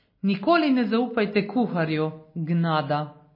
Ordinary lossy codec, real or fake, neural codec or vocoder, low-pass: MP3, 24 kbps; real; none; 5.4 kHz